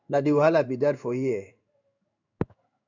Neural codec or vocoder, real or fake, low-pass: codec, 16 kHz in and 24 kHz out, 1 kbps, XY-Tokenizer; fake; 7.2 kHz